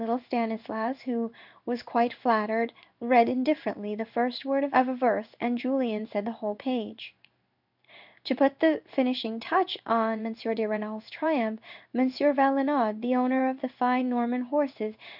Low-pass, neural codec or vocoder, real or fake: 5.4 kHz; codec, 16 kHz in and 24 kHz out, 1 kbps, XY-Tokenizer; fake